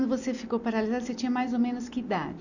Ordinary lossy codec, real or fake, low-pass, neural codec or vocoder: none; real; 7.2 kHz; none